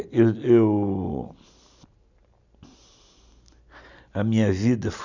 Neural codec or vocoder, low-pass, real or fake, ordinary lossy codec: none; 7.2 kHz; real; none